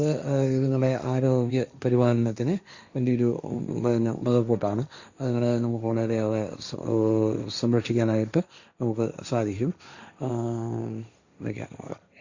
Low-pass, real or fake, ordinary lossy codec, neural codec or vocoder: 7.2 kHz; fake; Opus, 64 kbps; codec, 16 kHz, 1.1 kbps, Voila-Tokenizer